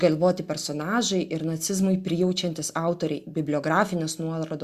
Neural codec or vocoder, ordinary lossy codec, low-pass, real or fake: none; Opus, 64 kbps; 14.4 kHz; real